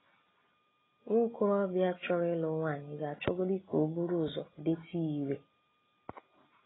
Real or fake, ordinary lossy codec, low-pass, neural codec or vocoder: real; AAC, 16 kbps; 7.2 kHz; none